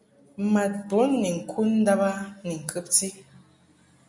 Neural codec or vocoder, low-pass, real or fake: none; 10.8 kHz; real